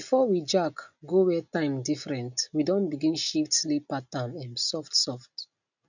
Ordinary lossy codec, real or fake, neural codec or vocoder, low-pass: none; real; none; 7.2 kHz